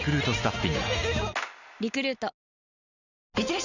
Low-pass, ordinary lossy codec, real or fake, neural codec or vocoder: 7.2 kHz; none; real; none